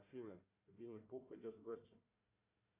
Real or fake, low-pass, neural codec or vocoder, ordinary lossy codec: fake; 3.6 kHz; codec, 16 kHz, 1 kbps, FunCodec, trained on Chinese and English, 50 frames a second; AAC, 32 kbps